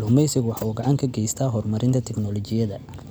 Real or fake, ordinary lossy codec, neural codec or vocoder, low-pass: real; none; none; none